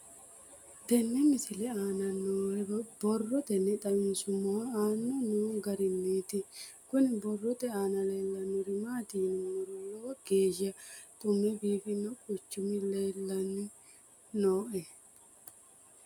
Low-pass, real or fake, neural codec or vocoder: 19.8 kHz; real; none